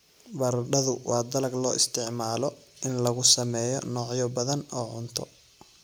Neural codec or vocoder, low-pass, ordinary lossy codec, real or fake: none; none; none; real